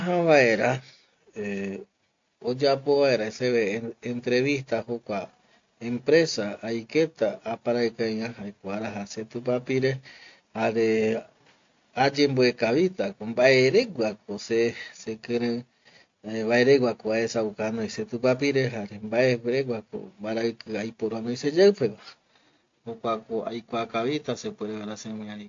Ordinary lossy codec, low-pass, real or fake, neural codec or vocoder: AAC, 48 kbps; 7.2 kHz; real; none